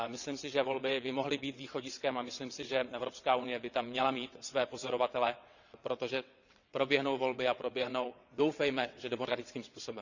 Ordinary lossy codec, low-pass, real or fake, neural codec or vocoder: none; 7.2 kHz; fake; vocoder, 22.05 kHz, 80 mel bands, WaveNeXt